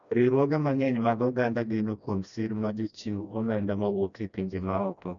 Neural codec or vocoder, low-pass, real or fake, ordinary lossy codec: codec, 16 kHz, 1 kbps, FreqCodec, smaller model; 7.2 kHz; fake; none